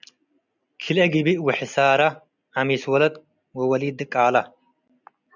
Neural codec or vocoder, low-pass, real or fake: none; 7.2 kHz; real